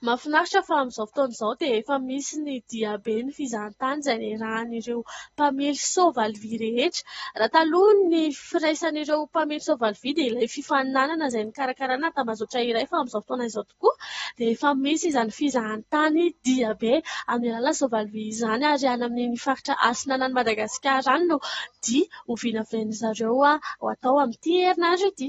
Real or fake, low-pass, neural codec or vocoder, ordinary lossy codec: real; 19.8 kHz; none; AAC, 24 kbps